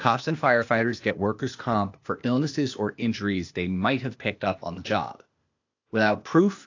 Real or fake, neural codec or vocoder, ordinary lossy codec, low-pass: fake; codec, 16 kHz, 0.8 kbps, ZipCodec; AAC, 48 kbps; 7.2 kHz